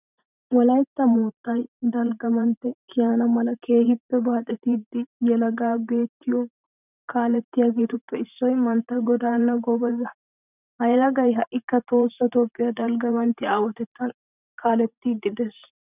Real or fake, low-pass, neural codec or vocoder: fake; 3.6 kHz; vocoder, 44.1 kHz, 128 mel bands every 512 samples, BigVGAN v2